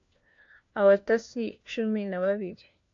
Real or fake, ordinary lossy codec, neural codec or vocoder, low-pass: fake; AAC, 48 kbps; codec, 16 kHz, 1 kbps, FunCodec, trained on LibriTTS, 50 frames a second; 7.2 kHz